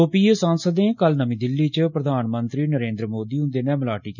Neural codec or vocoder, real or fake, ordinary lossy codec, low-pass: none; real; none; 7.2 kHz